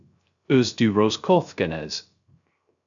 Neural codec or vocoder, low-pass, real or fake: codec, 16 kHz, 0.3 kbps, FocalCodec; 7.2 kHz; fake